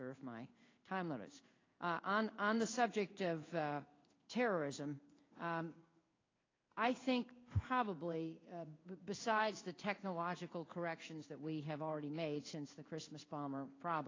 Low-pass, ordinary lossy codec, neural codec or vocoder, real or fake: 7.2 kHz; AAC, 32 kbps; codec, 16 kHz in and 24 kHz out, 1 kbps, XY-Tokenizer; fake